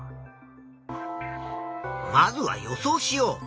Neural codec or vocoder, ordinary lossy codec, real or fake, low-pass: none; none; real; none